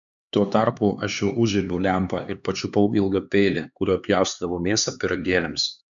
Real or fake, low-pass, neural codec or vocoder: fake; 7.2 kHz; codec, 16 kHz, 4 kbps, X-Codec, HuBERT features, trained on LibriSpeech